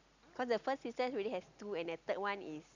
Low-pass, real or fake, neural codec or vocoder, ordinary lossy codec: 7.2 kHz; real; none; none